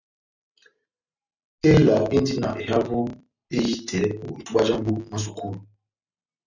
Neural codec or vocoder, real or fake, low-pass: none; real; 7.2 kHz